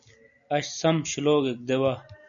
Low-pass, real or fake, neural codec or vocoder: 7.2 kHz; real; none